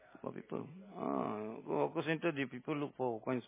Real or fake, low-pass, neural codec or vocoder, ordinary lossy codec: real; 3.6 kHz; none; MP3, 16 kbps